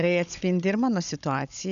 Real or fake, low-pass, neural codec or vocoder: fake; 7.2 kHz; codec, 16 kHz, 16 kbps, FunCodec, trained on Chinese and English, 50 frames a second